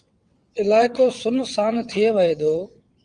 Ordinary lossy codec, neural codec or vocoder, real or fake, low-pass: Opus, 32 kbps; vocoder, 22.05 kHz, 80 mel bands, WaveNeXt; fake; 9.9 kHz